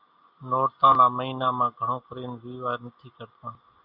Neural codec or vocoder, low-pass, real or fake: none; 5.4 kHz; real